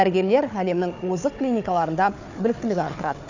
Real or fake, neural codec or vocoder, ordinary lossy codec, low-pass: fake; codec, 16 kHz, 4 kbps, FunCodec, trained on Chinese and English, 50 frames a second; none; 7.2 kHz